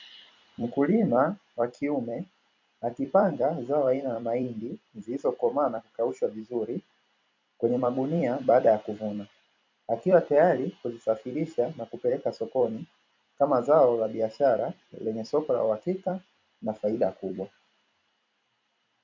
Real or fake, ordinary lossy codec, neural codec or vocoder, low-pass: fake; MP3, 48 kbps; vocoder, 44.1 kHz, 128 mel bands every 256 samples, BigVGAN v2; 7.2 kHz